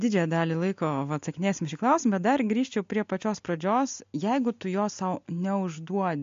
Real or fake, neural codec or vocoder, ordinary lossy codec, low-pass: real; none; MP3, 48 kbps; 7.2 kHz